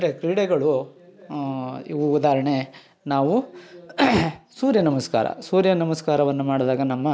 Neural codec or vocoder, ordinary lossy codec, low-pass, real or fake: none; none; none; real